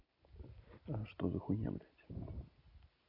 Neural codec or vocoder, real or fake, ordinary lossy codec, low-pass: none; real; none; 5.4 kHz